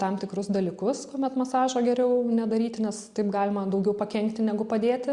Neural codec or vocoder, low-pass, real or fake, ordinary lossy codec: none; 10.8 kHz; real; Opus, 64 kbps